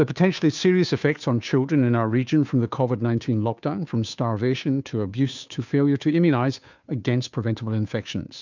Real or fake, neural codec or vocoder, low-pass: fake; codec, 16 kHz, 2 kbps, FunCodec, trained on Chinese and English, 25 frames a second; 7.2 kHz